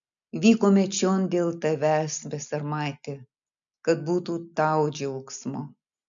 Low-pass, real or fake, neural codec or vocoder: 7.2 kHz; real; none